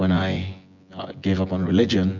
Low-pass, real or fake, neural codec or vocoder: 7.2 kHz; fake; vocoder, 24 kHz, 100 mel bands, Vocos